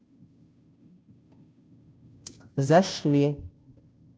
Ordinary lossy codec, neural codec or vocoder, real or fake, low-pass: none; codec, 16 kHz, 0.5 kbps, FunCodec, trained on Chinese and English, 25 frames a second; fake; none